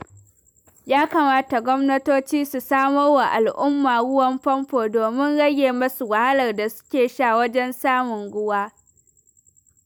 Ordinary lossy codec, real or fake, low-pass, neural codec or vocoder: none; real; none; none